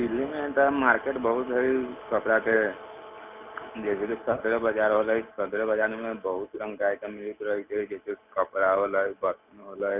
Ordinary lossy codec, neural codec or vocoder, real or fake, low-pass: none; none; real; 3.6 kHz